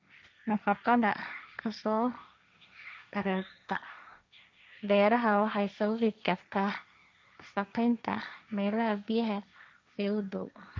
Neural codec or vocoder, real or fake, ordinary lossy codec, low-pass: codec, 16 kHz, 1.1 kbps, Voila-Tokenizer; fake; none; none